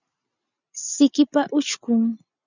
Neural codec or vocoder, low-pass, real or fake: none; 7.2 kHz; real